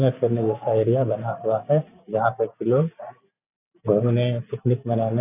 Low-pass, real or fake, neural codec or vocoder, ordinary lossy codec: 3.6 kHz; real; none; none